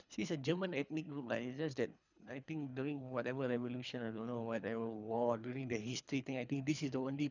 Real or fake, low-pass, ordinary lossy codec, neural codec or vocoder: fake; 7.2 kHz; none; codec, 24 kHz, 3 kbps, HILCodec